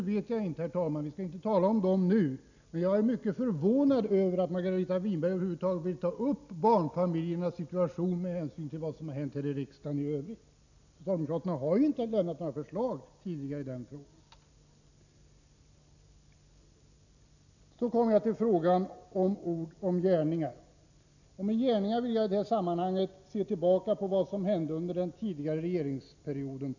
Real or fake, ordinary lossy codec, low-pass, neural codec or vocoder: real; none; 7.2 kHz; none